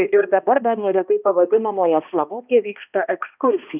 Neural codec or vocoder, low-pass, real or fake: codec, 16 kHz, 1 kbps, X-Codec, HuBERT features, trained on balanced general audio; 3.6 kHz; fake